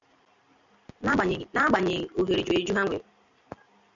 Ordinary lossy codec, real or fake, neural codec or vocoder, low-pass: MP3, 96 kbps; real; none; 7.2 kHz